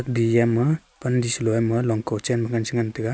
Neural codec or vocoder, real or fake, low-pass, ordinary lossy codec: none; real; none; none